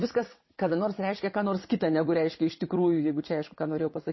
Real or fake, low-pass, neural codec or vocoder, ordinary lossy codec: fake; 7.2 kHz; vocoder, 22.05 kHz, 80 mel bands, WaveNeXt; MP3, 24 kbps